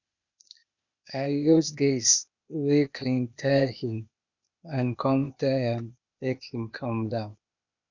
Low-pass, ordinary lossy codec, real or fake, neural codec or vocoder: 7.2 kHz; none; fake; codec, 16 kHz, 0.8 kbps, ZipCodec